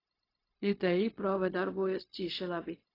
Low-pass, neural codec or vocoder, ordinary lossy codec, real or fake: 5.4 kHz; codec, 16 kHz, 0.4 kbps, LongCat-Audio-Codec; AAC, 24 kbps; fake